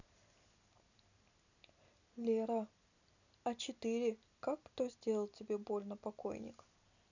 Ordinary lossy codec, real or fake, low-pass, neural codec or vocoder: none; real; 7.2 kHz; none